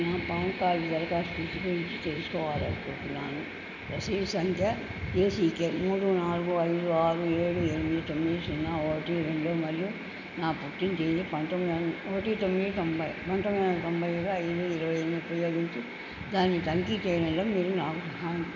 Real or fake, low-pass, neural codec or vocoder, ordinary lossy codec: real; 7.2 kHz; none; none